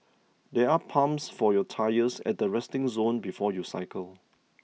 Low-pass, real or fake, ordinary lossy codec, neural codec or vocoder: none; real; none; none